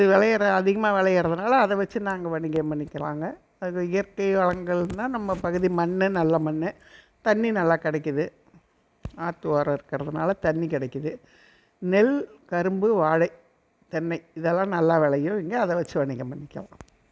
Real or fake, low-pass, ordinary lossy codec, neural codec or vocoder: real; none; none; none